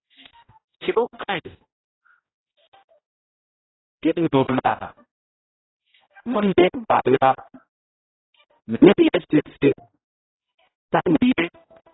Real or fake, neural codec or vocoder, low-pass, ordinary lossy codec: fake; codec, 16 kHz, 0.5 kbps, X-Codec, HuBERT features, trained on general audio; 7.2 kHz; AAC, 16 kbps